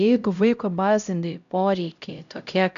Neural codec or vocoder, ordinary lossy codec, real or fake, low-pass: codec, 16 kHz, 0.5 kbps, X-Codec, HuBERT features, trained on LibriSpeech; AAC, 96 kbps; fake; 7.2 kHz